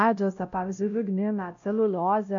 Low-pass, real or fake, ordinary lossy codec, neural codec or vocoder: 7.2 kHz; fake; AAC, 64 kbps; codec, 16 kHz, 0.5 kbps, X-Codec, WavLM features, trained on Multilingual LibriSpeech